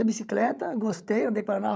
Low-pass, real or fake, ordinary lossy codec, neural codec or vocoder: none; fake; none; codec, 16 kHz, 16 kbps, FreqCodec, larger model